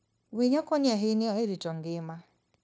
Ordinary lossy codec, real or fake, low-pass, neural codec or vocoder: none; fake; none; codec, 16 kHz, 0.9 kbps, LongCat-Audio-Codec